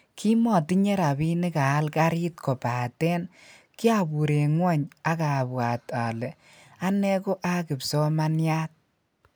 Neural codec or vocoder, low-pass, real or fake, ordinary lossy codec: none; none; real; none